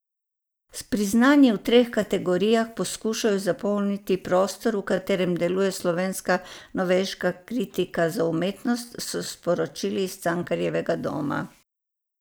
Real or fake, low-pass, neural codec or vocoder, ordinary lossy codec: real; none; none; none